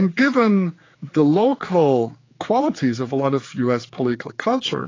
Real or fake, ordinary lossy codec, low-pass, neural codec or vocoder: fake; AAC, 32 kbps; 7.2 kHz; codec, 16 kHz, 4 kbps, X-Codec, HuBERT features, trained on general audio